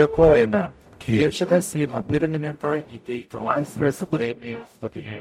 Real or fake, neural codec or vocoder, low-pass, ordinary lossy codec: fake; codec, 44.1 kHz, 0.9 kbps, DAC; 19.8 kHz; MP3, 64 kbps